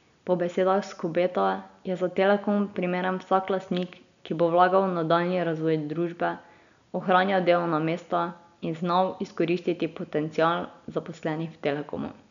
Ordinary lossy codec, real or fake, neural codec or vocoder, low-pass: MP3, 96 kbps; real; none; 7.2 kHz